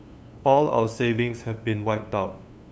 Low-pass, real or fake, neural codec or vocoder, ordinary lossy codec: none; fake; codec, 16 kHz, 2 kbps, FunCodec, trained on LibriTTS, 25 frames a second; none